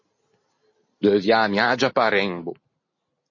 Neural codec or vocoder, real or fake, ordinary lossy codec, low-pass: none; real; MP3, 32 kbps; 7.2 kHz